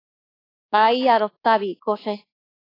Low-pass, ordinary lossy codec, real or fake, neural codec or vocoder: 5.4 kHz; AAC, 24 kbps; fake; codec, 24 kHz, 1.2 kbps, DualCodec